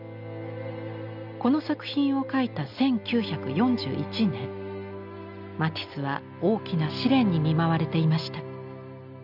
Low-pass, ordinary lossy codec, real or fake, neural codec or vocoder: 5.4 kHz; none; real; none